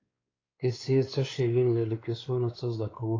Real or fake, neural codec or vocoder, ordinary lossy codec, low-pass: fake; codec, 16 kHz, 4 kbps, X-Codec, WavLM features, trained on Multilingual LibriSpeech; AAC, 32 kbps; 7.2 kHz